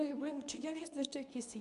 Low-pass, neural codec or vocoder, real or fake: 10.8 kHz; codec, 24 kHz, 0.9 kbps, WavTokenizer, medium speech release version 2; fake